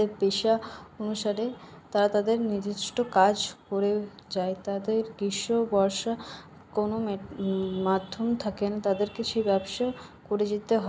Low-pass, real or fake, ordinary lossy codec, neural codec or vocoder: none; real; none; none